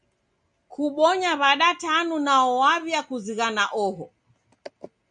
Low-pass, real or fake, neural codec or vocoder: 9.9 kHz; real; none